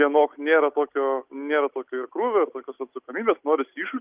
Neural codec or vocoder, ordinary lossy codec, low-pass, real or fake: none; Opus, 32 kbps; 3.6 kHz; real